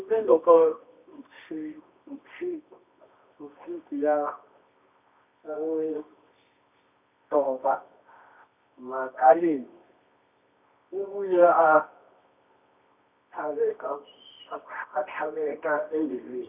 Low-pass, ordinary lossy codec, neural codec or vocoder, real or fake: 3.6 kHz; none; codec, 24 kHz, 0.9 kbps, WavTokenizer, medium music audio release; fake